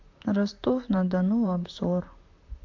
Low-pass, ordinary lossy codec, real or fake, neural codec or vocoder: 7.2 kHz; AAC, 48 kbps; fake; vocoder, 44.1 kHz, 128 mel bands, Pupu-Vocoder